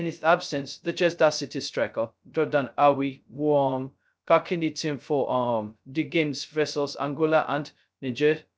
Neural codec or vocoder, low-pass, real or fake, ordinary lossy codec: codec, 16 kHz, 0.2 kbps, FocalCodec; none; fake; none